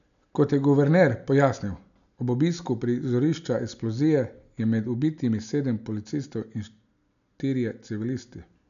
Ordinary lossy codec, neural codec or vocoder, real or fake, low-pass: none; none; real; 7.2 kHz